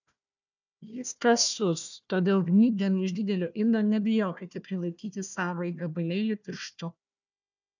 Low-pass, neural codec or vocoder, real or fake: 7.2 kHz; codec, 16 kHz, 1 kbps, FunCodec, trained on Chinese and English, 50 frames a second; fake